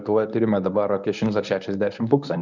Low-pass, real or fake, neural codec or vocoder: 7.2 kHz; fake; codec, 24 kHz, 0.9 kbps, WavTokenizer, medium speech release version 1